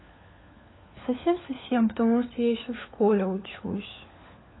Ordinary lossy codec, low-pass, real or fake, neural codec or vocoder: AAC, 16 kbps; 7.2 kHz; fake; codec, 16 kHz, 4 kbps, FunCodec, trained on LibriTTS, 50 frames a second